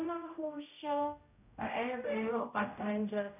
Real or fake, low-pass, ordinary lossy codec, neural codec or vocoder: fake; 3.6 kHz; none; codec, 16 kHz, 0.5 kbps, X-Codec, HuBERT features, trained on general audio